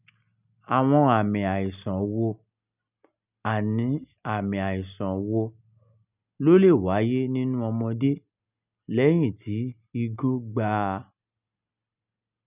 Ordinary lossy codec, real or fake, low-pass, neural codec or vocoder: none; real; 3.6 kHz; none